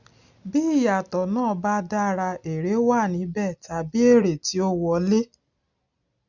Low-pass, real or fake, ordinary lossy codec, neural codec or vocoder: 7.2 kHz; real; none; none